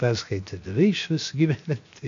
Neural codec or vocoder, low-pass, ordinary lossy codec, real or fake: codec, 16 kHz, 0.7 kbps, FocalCodec; 7.2 kHz; AAC, 48 kbps; fake